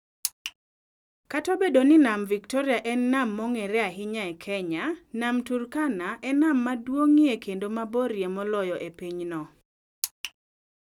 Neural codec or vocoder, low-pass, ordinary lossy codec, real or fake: none; 19.8 kHz; none; real